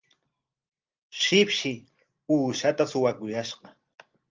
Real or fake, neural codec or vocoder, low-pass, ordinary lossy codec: real; none; 7.2 kHz; Opus, 32 kbps